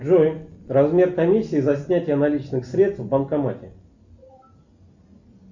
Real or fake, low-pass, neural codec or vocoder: real; 7.2 kHz; none